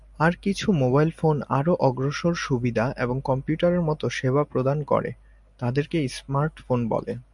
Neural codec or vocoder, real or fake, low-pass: none; real; 10.8 kHz